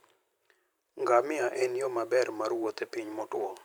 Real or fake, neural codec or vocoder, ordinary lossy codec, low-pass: fake; vocoder, 44.1 kHz, 128 mel bands every 512 samples, BigVGAN v2; none; none